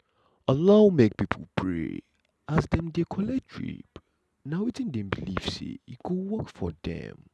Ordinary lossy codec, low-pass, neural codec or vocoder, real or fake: none; none; none; real